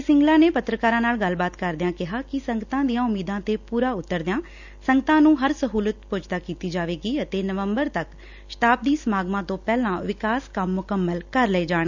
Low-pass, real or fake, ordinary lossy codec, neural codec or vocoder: 7.2 kHz; real; none; none